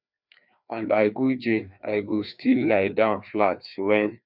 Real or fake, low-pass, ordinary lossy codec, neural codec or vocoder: fake; 5.4 kHz; none; codec, 16 kHz, 2 kbps, FreqCodec, larger model